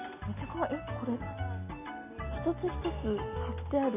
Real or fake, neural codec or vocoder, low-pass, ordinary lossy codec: real; none; 3.6 kHz; none